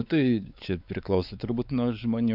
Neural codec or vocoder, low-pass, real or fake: codec, 16 kHz, 4 kbps, X-Codec, WavLM features, trained on Multilingual LibriSpeech; 5.4 kHz; fake